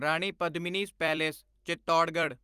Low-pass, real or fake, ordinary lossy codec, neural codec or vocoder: 14.4 kHz; fake; Opus, 32 kbps; vocoder, 44.1 kHz, 128 mel bands every 256 samples, BigVGAN v2